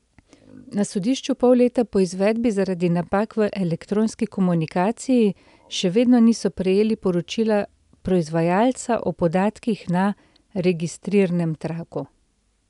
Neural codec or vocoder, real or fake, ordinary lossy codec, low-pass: none; real; none; 10.8 kHz